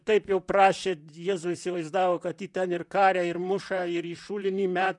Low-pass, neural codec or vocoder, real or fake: 10.8 kHz; vocoder, 44.1 kHz, 128 mel bands, Pupu-Vocoder; fake